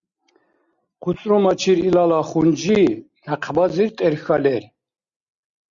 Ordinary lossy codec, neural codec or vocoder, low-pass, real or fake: Opus, 64 kbps; none; 7.2 kHz; real